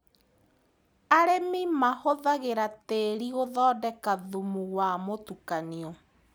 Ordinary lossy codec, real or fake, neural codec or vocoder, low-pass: none; real; none; none